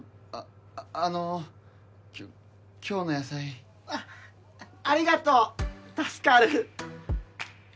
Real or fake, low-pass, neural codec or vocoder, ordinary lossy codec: real; none; none; none